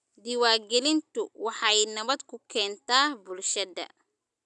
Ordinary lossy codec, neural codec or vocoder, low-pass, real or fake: none; none; 10.8 kHz; real